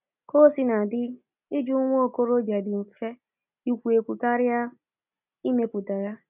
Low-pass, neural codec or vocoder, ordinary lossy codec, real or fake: 3.6 kHz; none; none; real